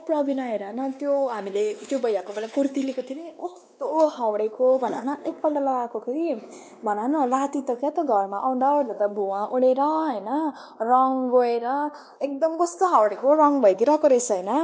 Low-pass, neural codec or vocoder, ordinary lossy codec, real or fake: none; codec, 16 kHz, 2 kbps, X-Codec, WavLM features, trained on Multilingual LibriSpeech; none; fake